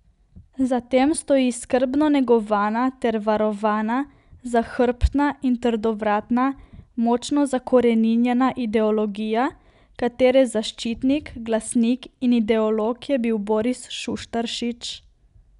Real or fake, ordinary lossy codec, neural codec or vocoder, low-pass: real; none; none; 10.8 kHz